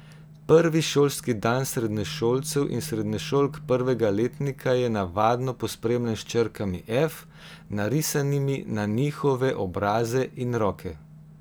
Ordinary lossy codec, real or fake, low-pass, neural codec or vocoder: none; real; none; none